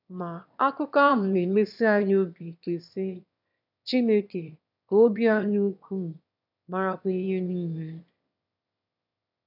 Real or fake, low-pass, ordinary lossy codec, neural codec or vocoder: fake; 5.4 kHz; none; autoencoder, 22.05 kHz, a latent of 192 numbers a frame, VITS, trained on one speaker